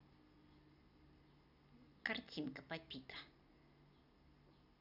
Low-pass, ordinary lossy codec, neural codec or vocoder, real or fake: 5.4 kHz; none; none; real